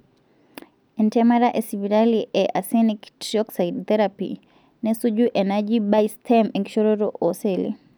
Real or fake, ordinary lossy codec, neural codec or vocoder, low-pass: real; none; none; none